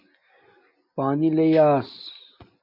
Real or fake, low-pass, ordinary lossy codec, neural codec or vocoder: real; 5.4 kHz; MP3, 32 kbps; none